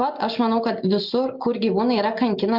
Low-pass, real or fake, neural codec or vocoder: 5.4 kHz; real; none